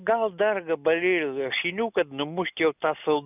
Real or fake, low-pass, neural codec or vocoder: real; 3.6 kHz; none